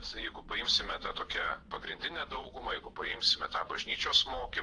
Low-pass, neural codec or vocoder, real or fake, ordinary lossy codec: 9.9 kHz; vocoder, 22.05 kHz, 80 mel bands, Vocos; fake; AAC, 48 kbps